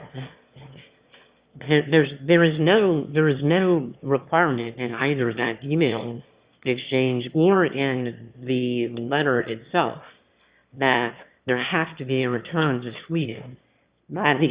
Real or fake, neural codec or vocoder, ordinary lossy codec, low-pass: fake; autoencoder, 22.05 kHz, a latent of 192 numbers a frame, VITS, trained on one speaker; Opus, 64 kbps; 3.6 kHz